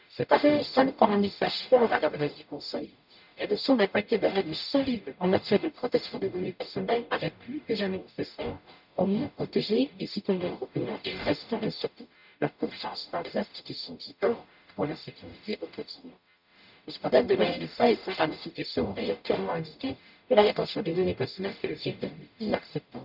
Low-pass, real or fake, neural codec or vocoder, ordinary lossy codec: 5.4 kHz; fake; codec, 44.1 kHz, 0.9 kbps, DAC; none